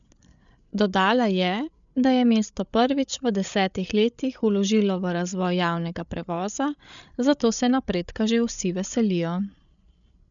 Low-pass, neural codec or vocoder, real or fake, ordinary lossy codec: 7.2 kHz; codec, 16 kHz, 8 kbps, FreqCodec, larger model; fake; none